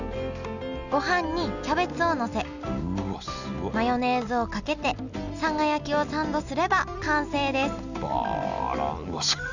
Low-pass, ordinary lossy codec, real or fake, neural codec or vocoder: 7.2 kHz; none; real; none